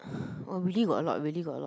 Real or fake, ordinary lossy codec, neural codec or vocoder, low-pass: real; none; none; none